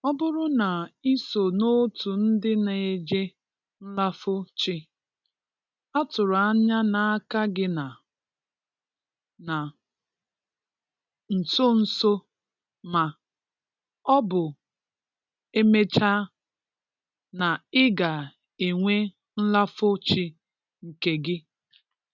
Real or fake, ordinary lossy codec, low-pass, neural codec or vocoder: real; none; 7.2 kHz; none